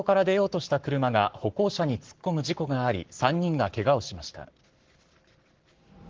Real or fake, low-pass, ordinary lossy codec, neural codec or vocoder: fake; 7.2 kHz; Opus, 16 kbps; codec, 44.1 kHz, 7.8 kbps, Pupu-Codec